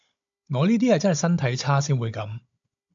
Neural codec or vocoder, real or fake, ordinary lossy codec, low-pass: codec, 16 kHz, 16 kbps, FunCodec, trained on Chinese and English, 50 frames a second; fake; AAC, 64 kbps; 7.2 kHz